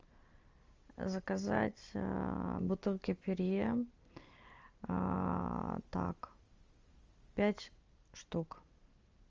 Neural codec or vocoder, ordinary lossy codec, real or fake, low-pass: none; Opus, 32 kbps; real; 7.2 kHz